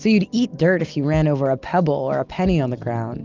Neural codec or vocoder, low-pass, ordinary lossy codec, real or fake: none; 7.2 kHz; Opus, 24 kbps; real